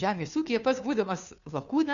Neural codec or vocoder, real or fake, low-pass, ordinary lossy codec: codec, 16 kHz, 2 kbps, FunCodec, trained on Chinese and English, 25 frames a second; fake; 7.2 kHz; MP3, 96 kbps